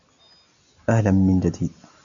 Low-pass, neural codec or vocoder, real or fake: 7.2 kHz; none; real